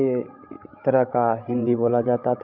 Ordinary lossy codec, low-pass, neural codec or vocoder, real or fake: none; 5.4 kHz; codec, 16 kHz, 16 kbps, FreqCodec, larger model; fake